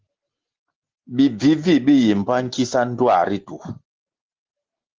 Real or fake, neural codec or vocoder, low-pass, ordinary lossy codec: real; none; 7.2 kHz; Opus, 16 kbps